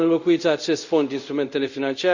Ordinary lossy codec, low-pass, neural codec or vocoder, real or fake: Opus, 64 kbps; 7.2 kHz; codec, 24 kHz, 0.5 kbps, DualCodec; fake